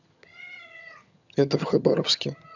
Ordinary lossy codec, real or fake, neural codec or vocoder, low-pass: none; fake; vocoder, 22.05 kHz, 80 mel bands, HiFi-GAN; 7.2 kHz